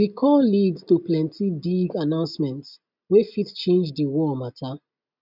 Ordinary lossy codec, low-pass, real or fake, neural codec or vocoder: none; 5.4 kHz; real; none